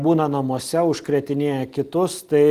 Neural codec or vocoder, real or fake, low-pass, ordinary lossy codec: none; real; 14.4 kHz; Opus, 24 kbps